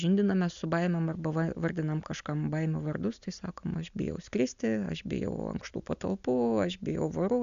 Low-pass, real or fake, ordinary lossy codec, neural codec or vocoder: 7.2 kHz; real; AAC, 96 kbps; none